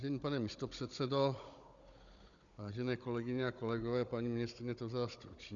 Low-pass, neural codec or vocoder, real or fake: 7.2 kHz; codec, 16 kHz, 16 kbps, FunCodec, trained on Chinese and English, 50 frames a second; fake